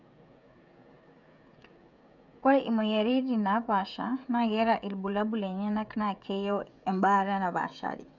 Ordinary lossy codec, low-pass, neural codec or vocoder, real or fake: none; 7.2 kHz; codec, 16 kHz, 16 kbps, FreqCodec, smaller model; fake